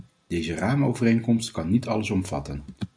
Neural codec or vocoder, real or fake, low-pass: none; real; 9.9 kHz